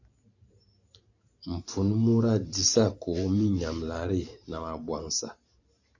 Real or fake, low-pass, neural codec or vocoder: fake; 7.2 kHz; vocoder, 44.1 kHz, 128 mel bands every 512 samples, BigVGAN v2